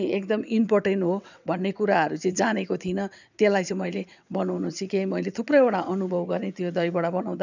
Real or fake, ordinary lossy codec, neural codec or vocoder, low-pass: fake; none; vocoder, 22.05 kHz, 80 mel bands, WaveNeXt; 7.2 kHz